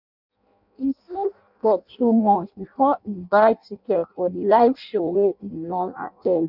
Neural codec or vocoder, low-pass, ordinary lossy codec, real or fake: codec, 16 kHz in and 24 kHz out, 0.6 kbps, FireRedTTS-2 codec; 5.4 kHz; none; fake